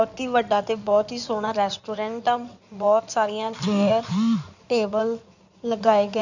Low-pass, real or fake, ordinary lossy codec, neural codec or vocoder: 7.2 kHz; fake; none; codec, 16 kHz in and 24 kHz out, 2.2 kbps, FireRedTTS-2 codec